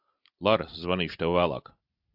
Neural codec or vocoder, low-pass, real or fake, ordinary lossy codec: none; 5.4 kHz; real; AAC, 48 kbps